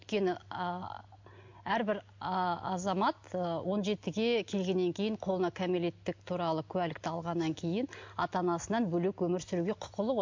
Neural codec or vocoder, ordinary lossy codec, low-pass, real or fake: none; MP3, 48 kbps; 7.2 kHz; real